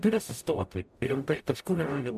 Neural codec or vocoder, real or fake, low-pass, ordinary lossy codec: codec, 44.1 kHz, 0.9 kbps, DAC; fake; 14.4 kHz; MP3, 96 kbps